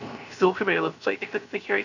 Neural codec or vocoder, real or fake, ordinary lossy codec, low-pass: codec, 16 kHz, 0.3 kbps, FocalCodec; fake; none; 7.2 kHz